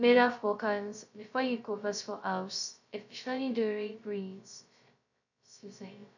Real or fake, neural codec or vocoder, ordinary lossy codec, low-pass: fake; codec, 16 kHz, 0.2 kbps, FocalCodec; none; 7.2 kHz